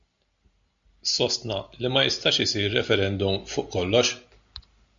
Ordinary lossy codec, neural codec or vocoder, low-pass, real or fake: MP3, 64 kbps; none; 7.2 kHz; real